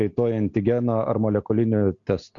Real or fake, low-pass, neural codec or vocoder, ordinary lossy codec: real; 7.2 kHz; none; Opus, 64 kbps